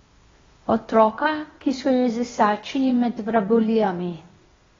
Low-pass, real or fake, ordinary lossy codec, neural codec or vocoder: 7.2 kHz; fake; AAC, 24 kbps; codec, 16 kHz, 0.8 kbps, ZipCodec